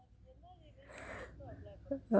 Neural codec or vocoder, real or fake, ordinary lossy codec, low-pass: none; real; none; none